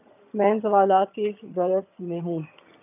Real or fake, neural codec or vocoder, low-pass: fake; vocoder, 22.05 kHz, 80 mel bands, HiFi-GAN; 3.6 kHz